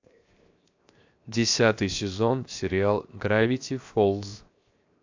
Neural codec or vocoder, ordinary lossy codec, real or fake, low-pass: codec, 16 kHz, 0.7 kbps, FocalCodec; AAC, 48 kbps; fake; 7.2 kHz